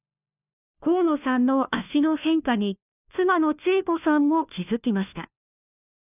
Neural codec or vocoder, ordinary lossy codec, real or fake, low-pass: codec, 16 kHz, 1 kbps, FunCodec, trained on LibriTTS, 50 frames a second; none; fake; 3.6 kHz